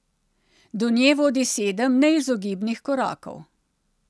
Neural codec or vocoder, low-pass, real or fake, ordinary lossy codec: none; none; real; none